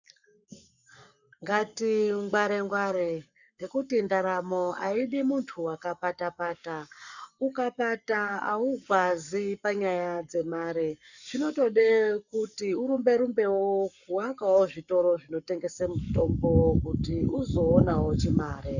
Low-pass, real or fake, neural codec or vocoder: 7.2 kHz; fake; codec, 44.1 kHz, 7.8 kbps, Pupu-Codec